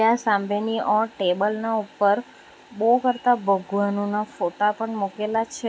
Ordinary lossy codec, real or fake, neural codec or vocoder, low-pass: none; real; none; none